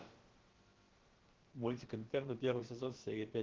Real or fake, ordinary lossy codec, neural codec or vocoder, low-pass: fake; Opus, 16 kbps; codec, 16 kHz, about 1 kbps, DyCAST, with the encoder's durations; 7.2 kHz